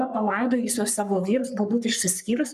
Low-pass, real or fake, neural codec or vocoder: 14.4 kHz; fake; codec, 44.1 kHz, 3.4 kbps, Pupu-Codec